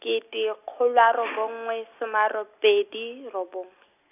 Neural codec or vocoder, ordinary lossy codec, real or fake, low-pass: none; none; real; 3.6 kHz